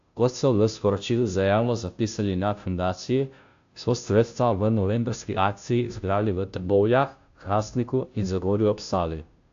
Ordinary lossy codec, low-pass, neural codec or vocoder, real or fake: AAC, 64 kbps; 7.2 kHz; codec, 16 kHz, 0.5 kbps, FunCodec, trained on Chinese and English, 25 frames a second; fake